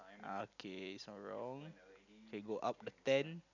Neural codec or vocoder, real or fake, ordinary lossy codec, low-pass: none; real; none; 7.2 kHz